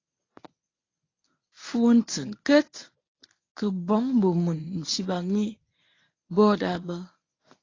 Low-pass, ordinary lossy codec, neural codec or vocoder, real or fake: 7.2 kHz; AAC, 32 kbps; codec, 24 kHz, 0.9 kbps, WavTokenizer, medium speech release version 1; fake